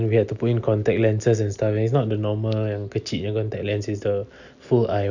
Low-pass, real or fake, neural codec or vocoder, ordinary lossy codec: 7.2 kHz; real; none; none